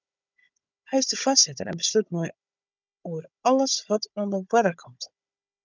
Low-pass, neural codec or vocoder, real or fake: 7.2 kHz; codec, 16 kHz, 16 kbps, FunCodec, trained on Chinese and English, 50 frames a second; fake